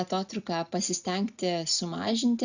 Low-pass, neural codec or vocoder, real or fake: 7.2 kHz; none; real